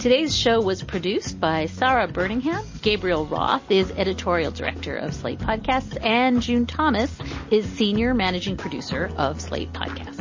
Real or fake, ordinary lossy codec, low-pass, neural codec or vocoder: real; MP3, 32 kbps; 7.2 kHz; none